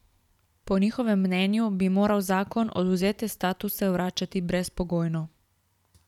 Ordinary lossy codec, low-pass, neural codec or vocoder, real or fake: none; 19.8 kHz; vocoder, 44.1 kHz, 128 mel bands every 512 samples, BigVGAN v2; fake